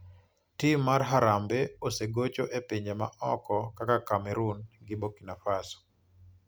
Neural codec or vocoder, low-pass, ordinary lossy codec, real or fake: none; none; none; real